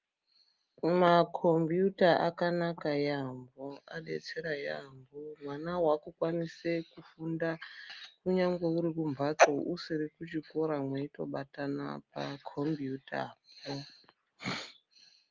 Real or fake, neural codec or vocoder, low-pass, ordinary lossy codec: real; none; 7.2 kHz; Opus, 32 kbps